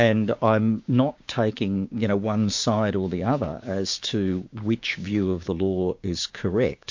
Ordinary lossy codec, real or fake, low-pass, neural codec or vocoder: MP3, 48 kbps; fake; 7.2 kHz; codec, 16 kHz, 6 kbps, DAC